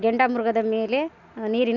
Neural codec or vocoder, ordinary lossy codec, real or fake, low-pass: none; none; real; 7.2 kHz